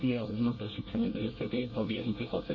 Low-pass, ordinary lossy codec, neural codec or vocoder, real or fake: 7.2 kHz; MP3, 32 kbps; codec, 24 kHz, 1 kbps, SNAC; fake